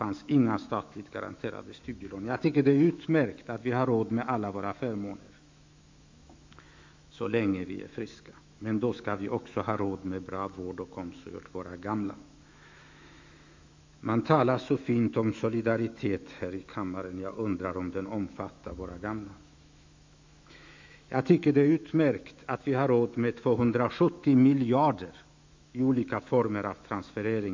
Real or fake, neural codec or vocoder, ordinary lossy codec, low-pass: fake; autoencoder, 48 kHz, 128 numbers a frame, DAC-VAE, trained on Japanese speech; MP3, 64 kbps; 7.2 kHz